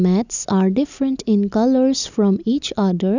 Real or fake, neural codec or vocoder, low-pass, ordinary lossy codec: real; none; 7.2 kHz; none